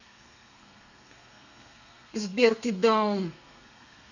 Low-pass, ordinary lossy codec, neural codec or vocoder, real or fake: 7.2 kHz; none; codec, 32 kHz, 1.9 kbps, SNAC; fake